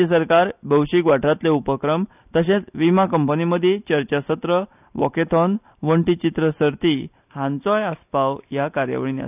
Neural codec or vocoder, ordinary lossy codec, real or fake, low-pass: none; none; real; 3.6 kHz